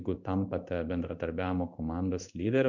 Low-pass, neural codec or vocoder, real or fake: 7.2 kHz; codec, 16 kHz in and 24 kHz out, 1 kbps, XY-Tokenizer; fake